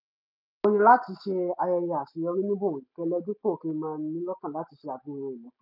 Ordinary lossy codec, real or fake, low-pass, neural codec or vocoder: none; real; 5.4 kHz; none